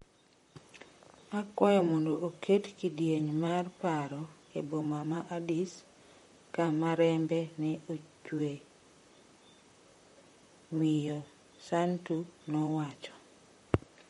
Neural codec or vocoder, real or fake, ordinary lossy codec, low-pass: vocoder, 44.1 kHz, 128 mel bands, Pupu-Vocoder; fake; MP3, 48 kbps; 19.8 kHz